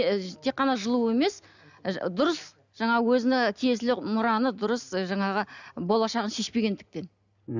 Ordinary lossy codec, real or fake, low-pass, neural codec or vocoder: none; real; 7.2 kHz; none